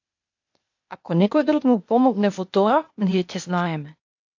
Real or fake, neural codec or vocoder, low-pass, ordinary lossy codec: fake; codec, 16 kHz, 0.8 kbps, ZipCodec; 7.2 kHz; MP3, 48 kbps